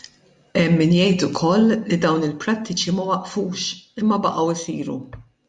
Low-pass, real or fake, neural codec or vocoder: 10.8 kHz; real; none